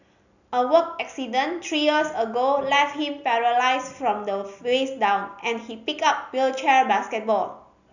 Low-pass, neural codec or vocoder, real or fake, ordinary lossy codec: 7.2 kHz; none; real; none